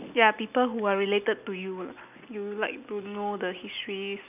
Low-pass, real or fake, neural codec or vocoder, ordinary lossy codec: 3.6 kHz; real; none; none